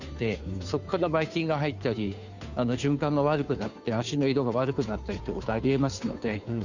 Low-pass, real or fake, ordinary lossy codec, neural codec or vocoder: 7.2 kHz; fake; none; codec, 16 kHz, 2 kbps, FunCodec, trained on Chinese and English, 25 frames a second